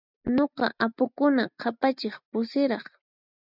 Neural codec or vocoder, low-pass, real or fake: none; 5.4 kHz; real